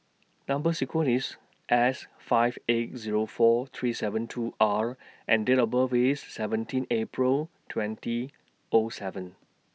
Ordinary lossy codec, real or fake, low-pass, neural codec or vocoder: none; real; none; none